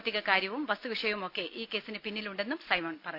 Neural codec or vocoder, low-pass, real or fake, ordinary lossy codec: none; 5.4 kHz; real; none